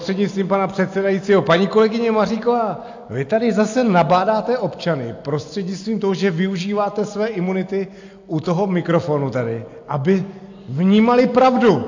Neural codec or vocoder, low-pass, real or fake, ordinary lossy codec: none; 7.2 kHz; real; AAC, 48 kbps